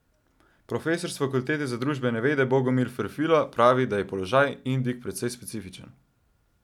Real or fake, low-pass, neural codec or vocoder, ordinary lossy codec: real; 19.8 kHz; none; none